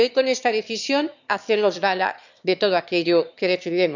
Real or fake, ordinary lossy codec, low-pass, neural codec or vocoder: fake; none; 7.2 kHz; autoencoder, 22.05 kHz, a latent of 192 numbers a frame, VITS, trained on one speaker